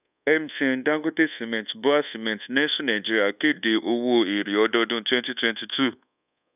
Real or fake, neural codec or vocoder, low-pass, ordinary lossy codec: fake; codec, 24 kHz, 1.2 kbps, DualCodec; 3.6 kHz; none